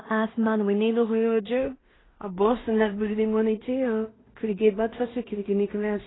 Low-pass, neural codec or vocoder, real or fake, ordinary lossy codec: 7.2 kHz; codec, 16 kHz in and 24 kHz out, 0.4 kbps, LongCat-Audio-Codec, two codebook decoder; fake; AAC, 16 kbps